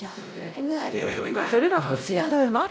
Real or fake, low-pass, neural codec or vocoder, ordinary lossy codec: fake; none; codec, 16 kHz, 0.5 kbps, X-Codec, WavLM features, trained on Multilingual LibriSpeech; none